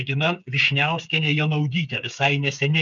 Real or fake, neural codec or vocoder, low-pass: fake; codec, 16 kHz, 8 kbps, FreqCodec, smaller model; 7.2 kHz